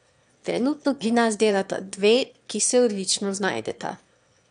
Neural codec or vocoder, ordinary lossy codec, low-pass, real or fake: autoencoder, 22.05 kHz, a latent of 192 numbers a frame, VITS, trained on one speaker; none; 9.9 kHz; fake